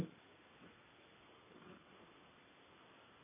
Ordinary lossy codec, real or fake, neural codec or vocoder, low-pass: MP3, 16 kbps; real; none; 3.6 kHz